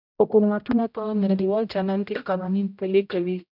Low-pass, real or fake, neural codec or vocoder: 5.4 kHz; fake; codec, 16 kHz, 0.5 kbps, X-Codec, HuBERT features, trained on general audio